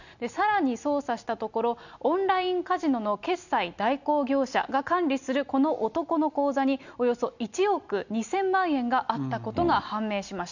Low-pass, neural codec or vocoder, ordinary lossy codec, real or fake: 7.2 kHz; none; none; real